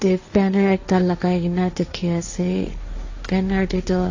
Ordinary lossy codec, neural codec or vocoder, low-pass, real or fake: AAC, 48 kbps; codec, 16 kHz, 1.1 kbps, Voila-Tokenizer; 7.2 kHz; fake